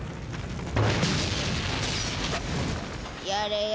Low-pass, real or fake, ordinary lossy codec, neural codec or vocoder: none; real; none; none